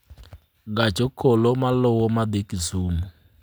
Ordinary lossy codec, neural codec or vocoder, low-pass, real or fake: none; none; none; real